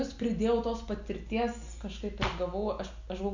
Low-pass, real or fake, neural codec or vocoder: 7.2 kHz; real; none